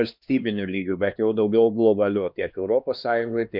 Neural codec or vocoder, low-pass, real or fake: codec, 16 kHz, 2 kbps, X-Codec, HuBERT features, trained on LibriSpeech; 5.4 kHz; fake